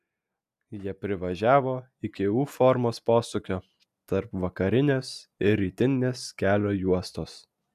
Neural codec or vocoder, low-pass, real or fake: none; 14.4 kHz; real